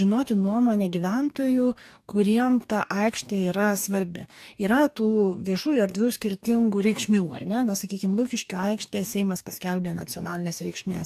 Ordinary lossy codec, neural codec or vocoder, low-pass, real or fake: AAC, 96 kbps; codec, 44.1 kHz, 2.6 kbps, DAC; 14.4 kHz; fake